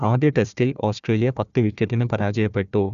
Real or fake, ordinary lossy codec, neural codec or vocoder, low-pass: fake; none; codec, 16 kHz, 1 kbps, FunCodec, trained on Chinese and English, 50 frames a second; 7.2 kHz